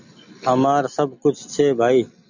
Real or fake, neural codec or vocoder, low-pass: real; none; 7.2 kHz